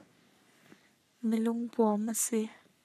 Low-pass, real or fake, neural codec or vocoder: 14.4 kHz; fake; codec, 44.1 kHz, 3.4 kbps, Pupu-Codec